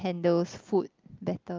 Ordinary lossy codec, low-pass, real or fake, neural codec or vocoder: Opus, 32 kbps; 7.2 kHz; real; none